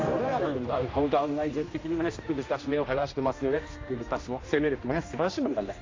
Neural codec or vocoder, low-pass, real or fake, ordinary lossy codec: codec, 16 kHz, 1 kbps, X-Codec, HuBERT features, trained on general audio; 7.2 kHz; fake; AAC, 32 kbps